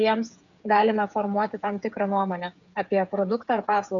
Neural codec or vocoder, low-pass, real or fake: codec, 16 kHz, 8 kbps, FreqCodec, smaller model; 7.2 kHz; fake